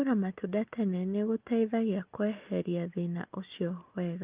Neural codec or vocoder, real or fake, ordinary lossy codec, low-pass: none; real; Opus, 32 kbps; 3.6 kHz